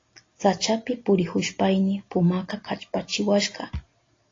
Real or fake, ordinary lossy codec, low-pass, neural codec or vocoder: real; AAC, 32 kbps; 7.2 kHz; none